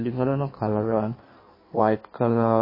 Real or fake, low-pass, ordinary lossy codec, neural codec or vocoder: fake; 5.4 kHz; MP3, 24 kbps; codec, 16 kHz in and 24 kHz out, 1.1 kbps, FireRedTTS-2 codec